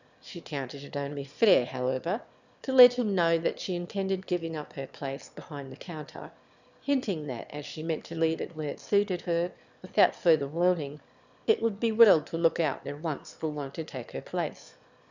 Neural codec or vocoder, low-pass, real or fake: autoencoder, 22.05 kHz, a latent of 192 numbers a frame, VITS, trained on one speaker; 7.2 kHz; fake